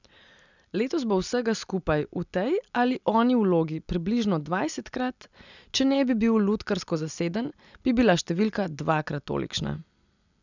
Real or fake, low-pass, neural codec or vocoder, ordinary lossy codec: real; 7.2 kHz; none; none